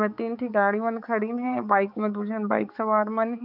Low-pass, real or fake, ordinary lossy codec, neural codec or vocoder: 5.4 kHz; fake; none; codec, 16 kHz, 4 kbps, X-Codec, HuBERT features, trained on balanced general audio